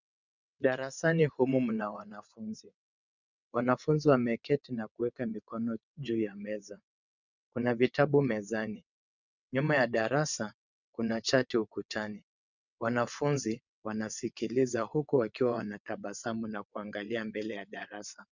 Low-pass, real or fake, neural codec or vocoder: 7.2 kHz; fake; vocoder, 22.05 kHz, 80 mel bands, WaveNeXt